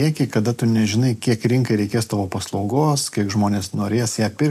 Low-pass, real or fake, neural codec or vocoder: 14.4 kHz; real; none